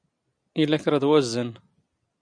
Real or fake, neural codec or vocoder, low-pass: real; none; 9.9 kHz